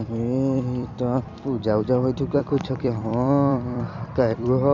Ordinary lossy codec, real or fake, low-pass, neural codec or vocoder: none; fake; 7.2 kHz; vocoder, 44.1 kHz, 128 mel bands every 256 samples, BigVGAN v2